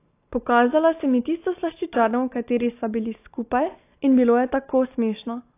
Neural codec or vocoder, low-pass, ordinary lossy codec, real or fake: none; 3.6 kHz; AAC, 24 kbps; real